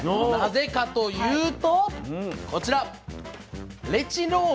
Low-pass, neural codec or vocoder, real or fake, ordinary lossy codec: none; none; real; none